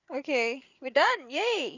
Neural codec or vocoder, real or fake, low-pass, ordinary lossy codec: codec, 16 kHz, 8 kbps, FreqCodec, larger model; fake; 7.2 kHz; none